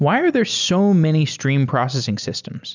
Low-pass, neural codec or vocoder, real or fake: 7.2 kHz; none; real